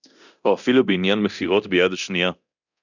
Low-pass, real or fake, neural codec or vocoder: 7.2 kHz; fake; codec, 24 kHz, 0.9 kbps, DualCodec